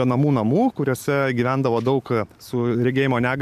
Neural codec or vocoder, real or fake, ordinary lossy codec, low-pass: vocoder, 44.1 kHz, 128 mel bands every 256 samples, BigVGAN v2; fake; MP3, 96 kbps; 14.4 kHz